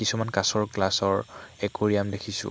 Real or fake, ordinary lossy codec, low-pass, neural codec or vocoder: real; none; none; none